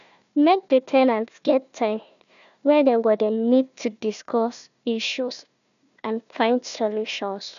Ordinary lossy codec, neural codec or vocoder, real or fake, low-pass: none; codec, 16 kHz, 1 kbps, FunCodec, trained on Chinese and English, 50 frames a second; fake; 7.2 kHz